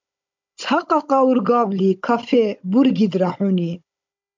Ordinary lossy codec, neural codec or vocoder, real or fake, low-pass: MP3, 64 kbps; codec, 16 kHz, 16 kbps, FunCodec, trained on Chinese and English, 50 frames a second; fake; 7.2 kHz